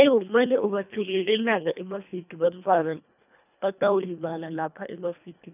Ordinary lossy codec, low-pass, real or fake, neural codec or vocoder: none; 3.6 kHz; fake; codec, 24 kHz, 1.5 kbps, HILCodec